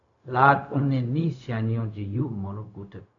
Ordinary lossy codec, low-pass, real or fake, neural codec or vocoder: AAC, 48 kbps; 7.2 kHz; fake; codec, 16 kHz, 0.4 kbps, LongCat-Audio-Codec